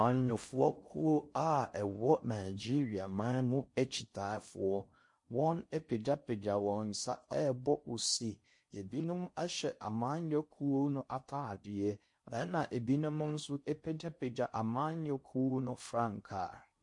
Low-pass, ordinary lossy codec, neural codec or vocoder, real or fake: 10.8 kHz; MP3, 48 kbps; codec, 16 kHz in and 24 kHz out, 0.6 kbps, FocalCodec, streaming, 4096 codes; fake